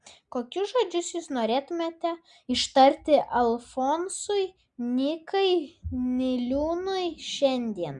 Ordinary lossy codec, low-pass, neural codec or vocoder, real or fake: Opus, 64 kbps; 9.9 kHz; none; real